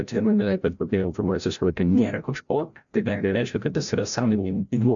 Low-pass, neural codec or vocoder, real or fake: 7.2 kHz; codec, 16 kHz, 0.5 kbps, FreqCodec, larger model; fake